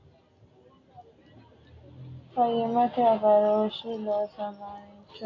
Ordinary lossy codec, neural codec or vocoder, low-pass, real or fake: AAC, 32 kbps; none; 7.2 kHz; real